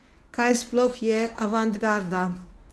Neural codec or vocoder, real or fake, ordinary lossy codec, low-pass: codec, 24 kHz, 0.9 kbps, WavTokenizer, medium speech release version 1; fake; none; none